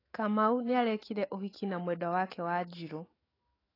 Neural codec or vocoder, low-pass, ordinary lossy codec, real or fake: autoencoder, 48 kHz, 128 numbers a frame, DAC-VAE, trained on Japanese speech; 5.4 kHz; AAC, 24 kbps; fake